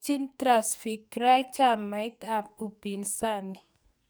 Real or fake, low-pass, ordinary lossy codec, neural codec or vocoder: fake; none; none; codec, 44.1 kHz, 2.6 kbps, SNAC